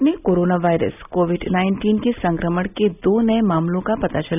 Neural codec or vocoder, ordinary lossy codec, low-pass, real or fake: none; none; 3.6 kHz; real